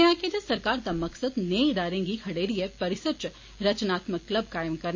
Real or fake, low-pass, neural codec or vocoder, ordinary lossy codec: real; 7.2 kHz; none; MP3, 32 kbps